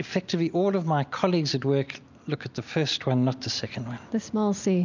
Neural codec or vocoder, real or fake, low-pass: none; real; 7.2 kHz